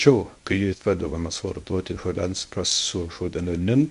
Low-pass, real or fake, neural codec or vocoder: 10.8 kHz; fake; codec, 24 kHz, 0.9 kbps, WavTokenizer, medium speech release version 1